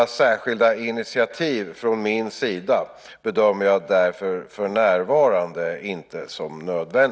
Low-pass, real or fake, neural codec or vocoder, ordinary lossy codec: none; real; none; none